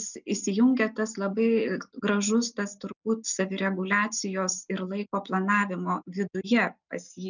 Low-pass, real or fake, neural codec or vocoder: 7.2 kHz; real; none